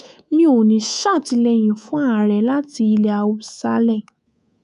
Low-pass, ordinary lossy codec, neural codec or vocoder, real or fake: 10.8 kHz; none; codec, 24 kHz, 3.1 kbps, DualCodec; fake